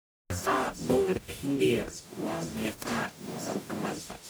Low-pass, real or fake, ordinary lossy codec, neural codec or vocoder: none; fake; none; codec, 44.1 kHz, 0.9 kbps, DAC